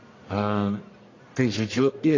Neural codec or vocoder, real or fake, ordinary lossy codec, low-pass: codec, 44.1 kHz, 1.7 kbps, Pupu-Codec; fake; AAC, 32 kbps; 7.2 kHz